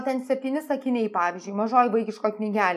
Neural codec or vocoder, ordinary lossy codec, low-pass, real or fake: none; MP3, 96 kbps; 14.4 kHz; real